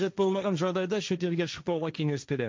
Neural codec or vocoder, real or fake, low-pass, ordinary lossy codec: codec, 16 kHz, 1.1 kbps, Voila-Tokenizer; fake; none; none